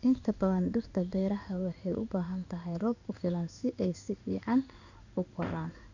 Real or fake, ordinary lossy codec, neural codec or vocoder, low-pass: fake; none; codec, 16 kHz in and 24 kHz out, 1 kbps, XY-Tokenizer; 7.2 kHz